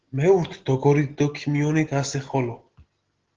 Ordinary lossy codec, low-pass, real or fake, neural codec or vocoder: Opus, 16 kbps; 7.2 kHz; real; none